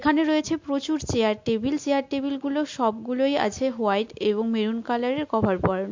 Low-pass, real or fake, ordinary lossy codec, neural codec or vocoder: 7.2 kHz; real; MP3, 48 kbps; none